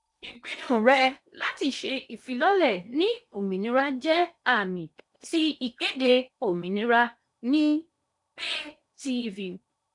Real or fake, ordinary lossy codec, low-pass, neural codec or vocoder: fake; none; 10.8 kHz; codec, 16 kHz in and 24 kHz out, 0.8 kbps, FocalCodec, streaming, 65536 codes